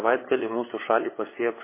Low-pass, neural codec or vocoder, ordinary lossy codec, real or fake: 3.6 kHz; codec, 16 kHz, 8 kbps, FunCodec, trained on LibriTTS, 25 frames a second; MP3, 16 kbps; fake